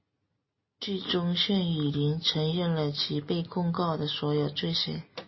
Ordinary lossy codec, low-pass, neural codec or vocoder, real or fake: MP3, 24 kbps; 7.2 kHz; none; real